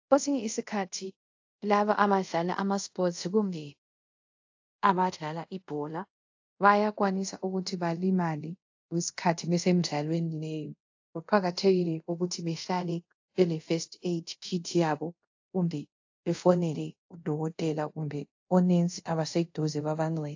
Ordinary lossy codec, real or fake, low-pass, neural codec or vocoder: AAC, 48 kbps; fake; 7.2 kHz; codec, 24 kHz, 0.5 kbps, DualCodec